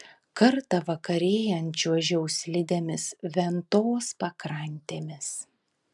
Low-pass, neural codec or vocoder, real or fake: 10.8 kHz; none; real